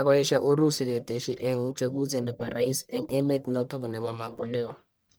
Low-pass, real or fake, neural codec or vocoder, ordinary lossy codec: none; fake; codec, 44.1 kHz, 1.7 kbps, Pupu-Codec; none